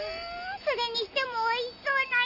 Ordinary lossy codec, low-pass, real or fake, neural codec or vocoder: none; 5.4 kHz; real; none